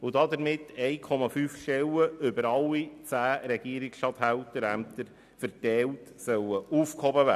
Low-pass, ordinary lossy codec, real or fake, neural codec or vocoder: 14.4 kHz; none; real; none